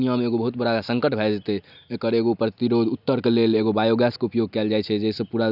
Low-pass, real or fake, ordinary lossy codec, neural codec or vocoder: 5.4 kHz; real; none; none